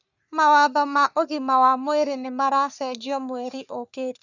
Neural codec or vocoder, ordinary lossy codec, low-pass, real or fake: codec, 44.1 kHz, 3.4 kbps, Pupu-Codec; none; 7.2 kHz; fake